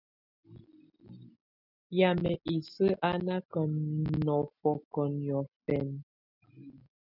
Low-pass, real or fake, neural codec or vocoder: 5.4 kHz; real; none